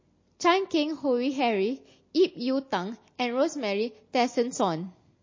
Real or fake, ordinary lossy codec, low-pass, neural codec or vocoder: real; MP3, 32 kbps; 7.2 kHz; none